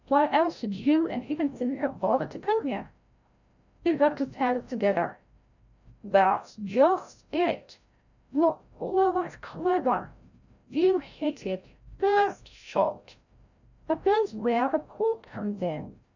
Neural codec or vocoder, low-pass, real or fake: codec, 16 kHz, 0.5 kbps, FreqCodec, larger model; 7.2 kHz; fake